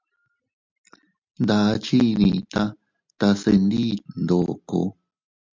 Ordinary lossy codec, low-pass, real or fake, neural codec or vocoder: MP3, 64 kbps; 7.2 kHz; real; none